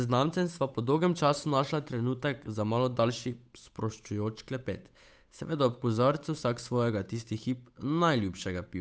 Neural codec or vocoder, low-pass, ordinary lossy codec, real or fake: codec, 16 kHz, 8 kbps, FunCodec, trained on Chinese and English, 25 frames a second; none; none; fake